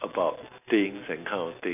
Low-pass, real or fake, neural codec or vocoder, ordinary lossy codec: 3.6 kHz; real; none; none